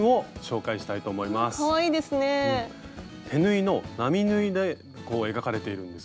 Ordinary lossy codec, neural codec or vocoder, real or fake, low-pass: none; none; real; none